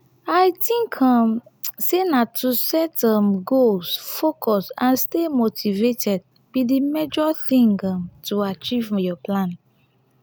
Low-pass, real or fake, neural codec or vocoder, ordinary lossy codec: none; real; none; none